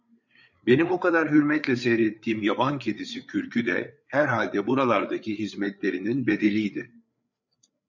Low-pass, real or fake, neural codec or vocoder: 7.2 kHz; fake; codec, 16 kHz, 8 kbps, FreqCodec, larger model